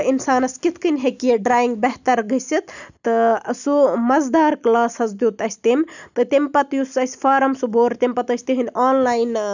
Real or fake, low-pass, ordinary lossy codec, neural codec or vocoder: real; 7.2 kHz; none; none